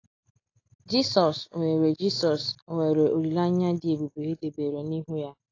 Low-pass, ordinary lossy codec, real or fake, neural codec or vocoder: 7.2 kHz; AAC, 32 kbps; real; none